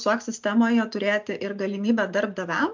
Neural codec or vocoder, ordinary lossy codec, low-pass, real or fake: none; MP3, 64 kbps; 7.2 kHz; real